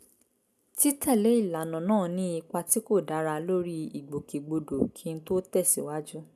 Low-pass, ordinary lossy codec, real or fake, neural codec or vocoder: 14.4 kHz; none; real; none